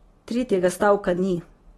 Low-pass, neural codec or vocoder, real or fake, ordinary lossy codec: 19.8 kHz; none; real; AAC, 32 kbps